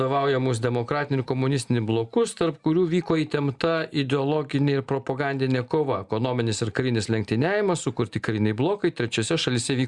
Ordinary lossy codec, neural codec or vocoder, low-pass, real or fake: Opus, 64 kbps; none; 10.8 kHz; real